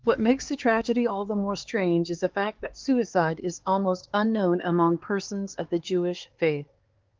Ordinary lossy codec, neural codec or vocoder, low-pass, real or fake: Opus, 16 kbps; codec, 16 kHz, 4 kbps, X-Codec, HuBERT features, trained on LibriSpeech; 7.2 kHz; fake